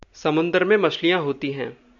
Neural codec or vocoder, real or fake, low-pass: none; real; 7.2 kHz